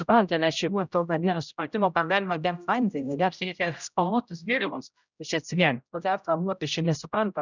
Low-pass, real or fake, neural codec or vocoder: 7.2 kHz; fake; codec, 16 kHz, 0.5 kbps, X-Codec, HuBERT features, trained on general audio